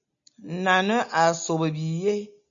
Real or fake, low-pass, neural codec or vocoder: real; 7.2 kHz; none